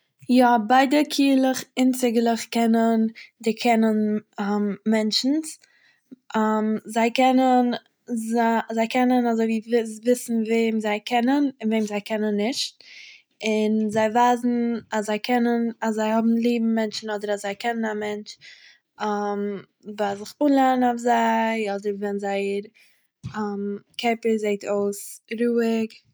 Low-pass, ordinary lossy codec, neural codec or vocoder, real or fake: none; none; none; real